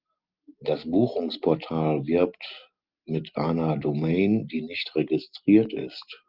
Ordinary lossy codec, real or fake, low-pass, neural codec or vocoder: Opus, 32 kbps; real; 5.4 kHz; none